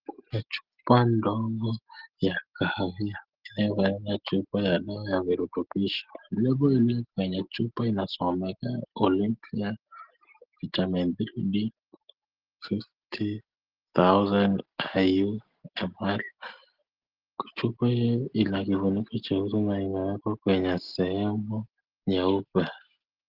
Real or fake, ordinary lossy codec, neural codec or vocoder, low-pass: real; Opus, 16 kbps; none; 5.4 kHz